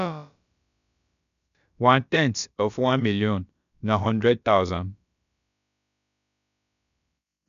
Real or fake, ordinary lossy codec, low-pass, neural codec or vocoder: fake; none; 7.2 kHz; codec, 16 kHz, about 1 kbps, DyCAST, with the encoder's durations